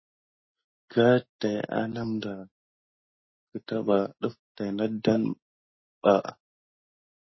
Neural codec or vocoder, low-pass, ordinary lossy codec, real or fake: none; 7.2 kHz; MP3, 24 kbps; real